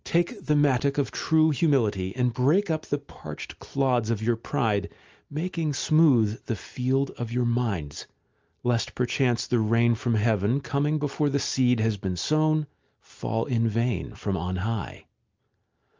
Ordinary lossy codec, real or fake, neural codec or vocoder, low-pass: Opus, 24 kbps; real; none; 7.2 kHz